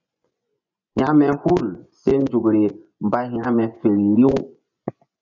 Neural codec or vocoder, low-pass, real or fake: vocoder, 24 kHz, 100 mel bands, Vocos; 7.2 kHz; fake